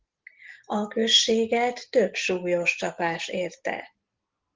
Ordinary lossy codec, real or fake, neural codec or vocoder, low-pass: Opus, 16 kbps; real; none; 7.2 kHz